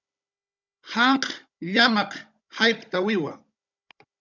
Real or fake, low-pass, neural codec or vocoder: fake; 7.2 kHz; codec, 16 kHz, 16 kbps, FunCodec, trained on Chinese and English, 50 frames a second